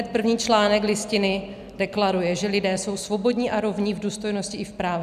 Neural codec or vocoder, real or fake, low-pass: none; real; 14.4 kHz